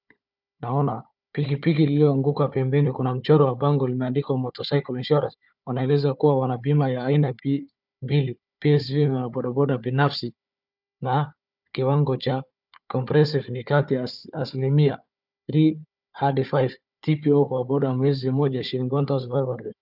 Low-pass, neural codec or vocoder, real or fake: 5.4 kHz; codec, 16 kHz, 4 kbps, FunCodec, trained on Chinese and English, 50 frames a second; fake